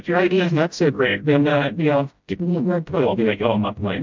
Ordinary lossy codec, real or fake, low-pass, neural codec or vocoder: MP3, 64 kbps; fake; 7.2 kHz; codec, 16 kHz, 0.5 kbps, FreqCodec, smaller model